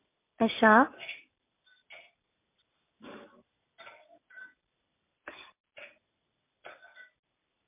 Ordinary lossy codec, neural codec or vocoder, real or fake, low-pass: MP3, 24 kbps; none; real; 3.6 kHz